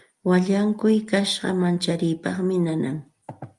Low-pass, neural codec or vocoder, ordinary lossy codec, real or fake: 10.8 kHz; none; Opus, 32 kbps; real